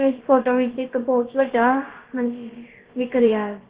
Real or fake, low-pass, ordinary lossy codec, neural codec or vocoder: fake; 3.6 kHz; Opus, 16 kbps; codec, 16 kHz, about 1 kbps, DyCAST, with the encoder's durations